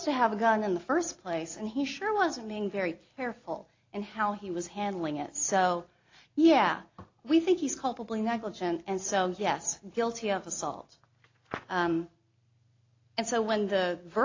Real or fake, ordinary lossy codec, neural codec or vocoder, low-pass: real; AAC, 32 kbps; none; 7.2 kHz